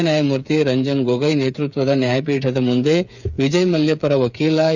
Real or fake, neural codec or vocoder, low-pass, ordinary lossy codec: fake; codec, 16 kHz, 8 kbps, FreqCodec, smaller model; 7.2 kHz; none